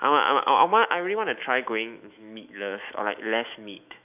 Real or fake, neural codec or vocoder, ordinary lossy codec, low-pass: fake; autoencoder, 48 kHz, 128 numbers a frame, DAC-VAE, trained on Japanese speech; none; 3.6 kHz